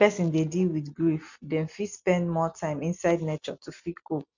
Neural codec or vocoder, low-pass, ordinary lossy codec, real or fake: none; 7.2 kHz; none; real